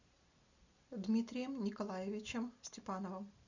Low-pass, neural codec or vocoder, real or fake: 7.2 kHz; none; real